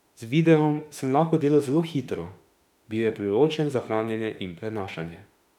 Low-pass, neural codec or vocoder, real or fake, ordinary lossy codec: 19.8 kHz; autoencoder, 48 kHz, 32 numbers a frame, DAC-VAE, trained on Japanese speech; fake; none